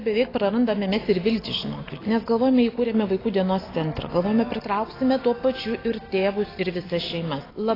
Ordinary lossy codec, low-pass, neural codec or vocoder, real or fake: AAC, 24 kbps; 5.4 kHz; none; real